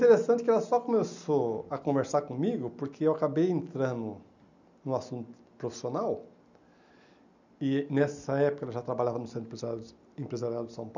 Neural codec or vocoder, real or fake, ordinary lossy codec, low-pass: none; real; none; 7.2 kHz